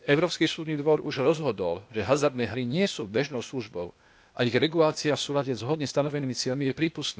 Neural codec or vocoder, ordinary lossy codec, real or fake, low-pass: codec, 16 kHz, 0.8 kbps, ZipCodec; none; fake; none